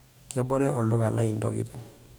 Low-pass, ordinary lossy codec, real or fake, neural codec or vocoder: none; none; fake; codec, 44.1 kHz, 2.6 kbps, DAC